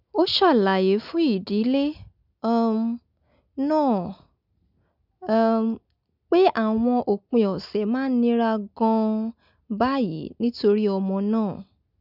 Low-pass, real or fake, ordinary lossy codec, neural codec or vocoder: 5.4 kHz; real; none; none